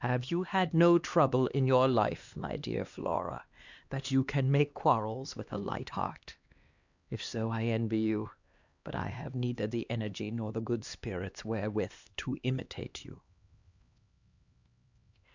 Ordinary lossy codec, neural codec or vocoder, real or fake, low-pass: Opus, 64 kbps; codec, 16 kHz, 2 kbps, X-Codec, HuBERT features, trained on LibriSpeech; fake; 7.2 kHz